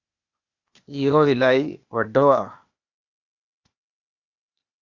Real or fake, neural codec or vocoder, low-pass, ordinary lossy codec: fake; codec, 16 kHz, 0.8 kbps, ZipCodec; 7.2 kHz; Opus, 64 kbps